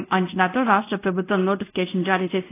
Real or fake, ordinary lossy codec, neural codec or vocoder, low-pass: fake; AAC, 24 kbps; codec, 24 kHz, 0.5 kbps, DualCodec; 3.6 kHz